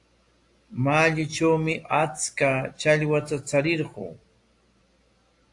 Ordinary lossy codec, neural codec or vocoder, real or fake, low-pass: AAC, 48 kbps; none; real; 10.8 kHz